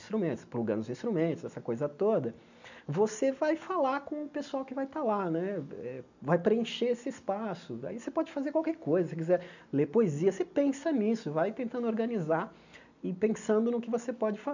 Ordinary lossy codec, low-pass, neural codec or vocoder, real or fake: none; 7.2 kHz; none; real